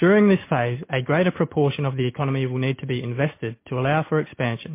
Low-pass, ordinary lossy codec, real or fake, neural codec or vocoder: 3.6 kHz; MP3, 24 kbps; real; none